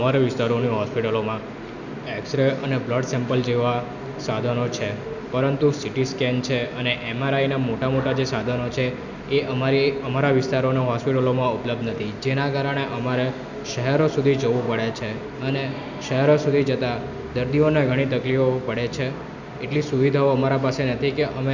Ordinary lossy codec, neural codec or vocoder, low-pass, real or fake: none; none; 7.2 kHz; real